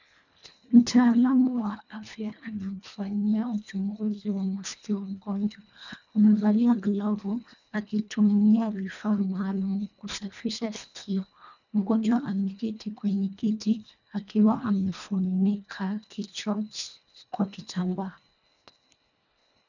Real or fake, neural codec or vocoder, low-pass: fake; codec, 24 kHz, 1.5 kbps, HILCodec; 7.2 kHz